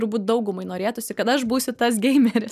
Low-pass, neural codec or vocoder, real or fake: 14.4 kHz; none; real